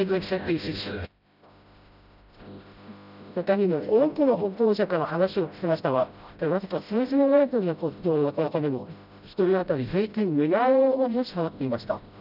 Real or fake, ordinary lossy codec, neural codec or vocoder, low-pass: fake; none; codec, 16 kHz, 0.5 kbps, FreqCodec, smaller model; 5.4 kHz